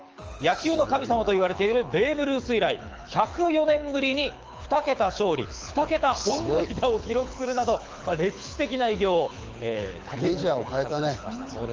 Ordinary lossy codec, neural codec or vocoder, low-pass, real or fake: Opus, 24 kbps; codec, 24 kHz, 6 kbps, HILCodec; 7.2 kHz; fake